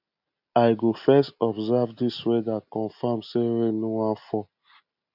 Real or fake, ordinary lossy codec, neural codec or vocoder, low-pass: real; none; none; 5.4 kHz